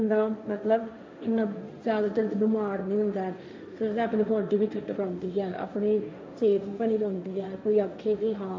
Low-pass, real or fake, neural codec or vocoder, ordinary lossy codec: none; fake; codec, 16 kHz, 1.1 kbps, Voila-Tokenizer; none